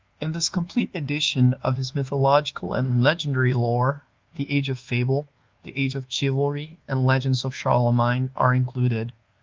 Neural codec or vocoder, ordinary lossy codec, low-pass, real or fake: codec, 24 kHz, 1.2 kbps, DualCodec; Opus, 32 kbps; 7.2 kHz; fake